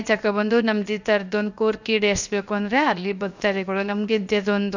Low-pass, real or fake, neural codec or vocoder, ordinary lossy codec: 7.2 kHz; fake; codec, 16 kHz, 0.7 kbps, FocalCodec; none